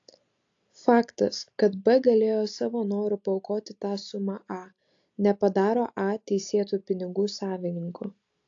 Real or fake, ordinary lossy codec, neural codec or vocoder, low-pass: real; AAC, 48 kbps; none; 7.2 kHz